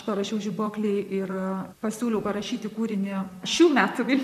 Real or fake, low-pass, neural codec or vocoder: fake; 14.4 kHz; vocoder, 44.1 kHz, 128 mel bands, Pupu-Vocoder